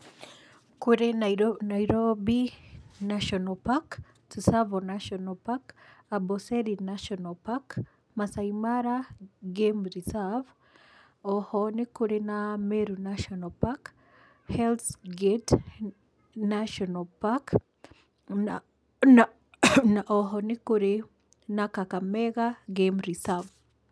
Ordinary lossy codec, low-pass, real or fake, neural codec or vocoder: none; none; real; none